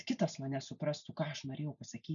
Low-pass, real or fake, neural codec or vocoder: 7.2 kHz; real; none